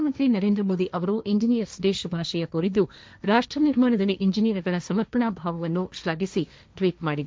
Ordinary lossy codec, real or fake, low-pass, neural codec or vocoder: none; fake; none; codec, 16 kHz, 1.1 kbps, Voila-Tokenizer